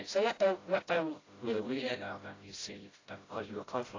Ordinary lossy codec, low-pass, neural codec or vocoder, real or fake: AAC, 32 kbps; 7.2 kHz; codec, 16 kHz, 0.5 kbps, FreqCodec, smaller model; fake